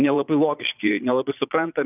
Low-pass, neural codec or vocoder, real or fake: 3.6 kHz; vocoder, 22.05 kHz, 80 mel bands, Vocos; fake